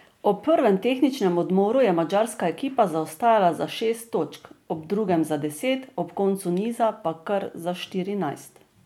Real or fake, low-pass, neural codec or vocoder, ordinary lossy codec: real; 19.8 kHz; none; MP3, 96 kbps